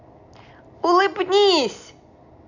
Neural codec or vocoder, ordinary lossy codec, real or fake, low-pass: none; none; real; 7.2 kHz